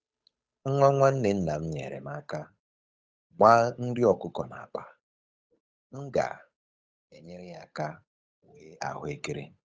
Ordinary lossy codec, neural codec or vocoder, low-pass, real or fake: none; codec, 16 kHz, 8 kbps, FunCodec, trained on Chinese and English, 25 frames a second; none; fake